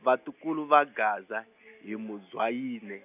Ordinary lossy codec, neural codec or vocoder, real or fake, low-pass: none; none; real; 3.6 kHz